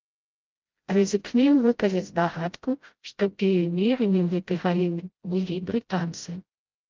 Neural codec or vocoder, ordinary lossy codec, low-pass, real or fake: codec, 16 kHz, 0.5 kbps, FreqCodec, smaller model; Opus, 32 kbps; 7.2 kHz; fake